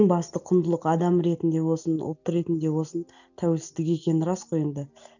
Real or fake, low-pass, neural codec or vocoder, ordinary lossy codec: real; 7.2 kHz; none; none